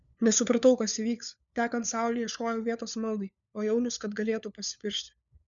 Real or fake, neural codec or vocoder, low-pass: fake; codec, 16 kHz, 4 kbps, FunCodec, trained on LibriTTS, 50 frames a second; 7.2 kHz